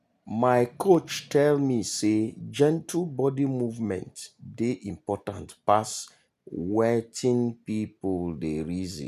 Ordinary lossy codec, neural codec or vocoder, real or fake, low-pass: none; none; real; 14.4 kHz